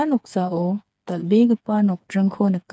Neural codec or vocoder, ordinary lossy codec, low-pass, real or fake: codec, 16 kHz, 4 kbps, FreqCodec, smaller model; none; none; fake